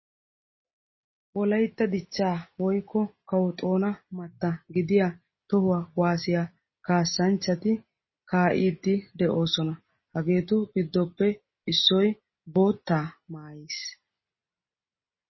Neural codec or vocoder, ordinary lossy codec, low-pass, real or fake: none; MP3, 24 kbps; 7.2 kHz; real